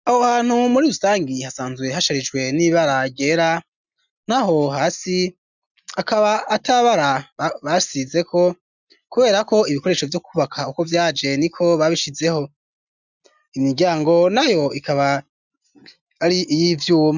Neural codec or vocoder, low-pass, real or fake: none; 7.2 kHz; real